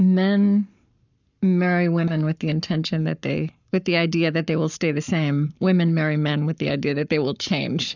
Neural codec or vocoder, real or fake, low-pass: codec, 44.1 kHz, 7.8 kbps, Pupu-Codec; fake; 7.2 kHz